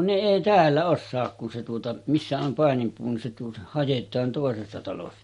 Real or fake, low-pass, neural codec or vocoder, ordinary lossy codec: real; 19.8 kHz; none; MP3, 48 kbps